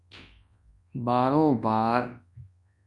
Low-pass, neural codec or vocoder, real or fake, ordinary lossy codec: 10.8 kHz; codec, 24 kHz, 0.9 kbps, WavTokenizer, large speech release; fake; MP3, 64 kbps